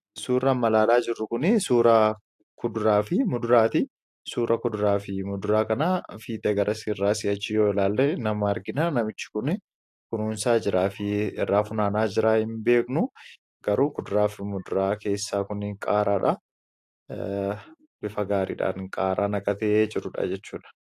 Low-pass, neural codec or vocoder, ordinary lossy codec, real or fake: 14.4 kHz; none; AAC, 64 kbps; real